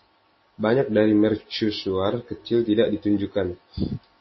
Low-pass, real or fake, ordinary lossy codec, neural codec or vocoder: 7.2 kHz; real; MP3, 24 kbps; none